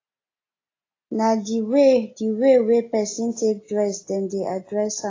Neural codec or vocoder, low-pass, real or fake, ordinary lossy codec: none; 7.2 kHz; real; AAC, 32 kbps